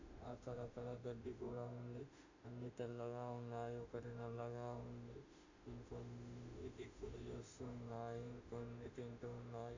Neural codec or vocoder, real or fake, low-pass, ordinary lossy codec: autoencoder, 48 kHz, 32 numbers a frame, DAC-VAE, trained on Japanese speech; fake; 7.2 kHz; AAC, 48 kbps